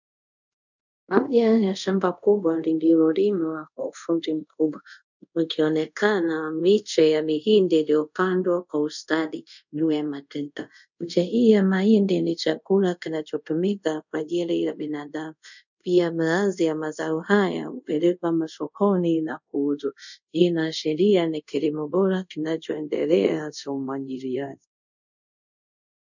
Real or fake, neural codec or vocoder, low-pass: fake; codec, 24 kHz, 0.5 kbps, DualCodec; 7.2 kHz